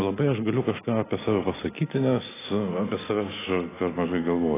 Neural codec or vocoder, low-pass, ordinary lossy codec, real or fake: none; 3.6 kHz; AAC, 16 kbps; real